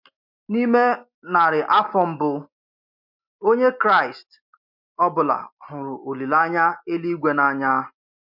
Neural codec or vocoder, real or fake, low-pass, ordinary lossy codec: none; real; 5.4 kHz; MP3, 48 kbps